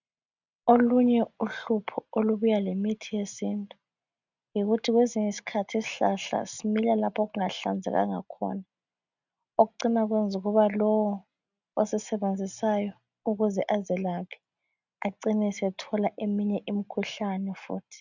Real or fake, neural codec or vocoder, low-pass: real; none; 7.2 kHz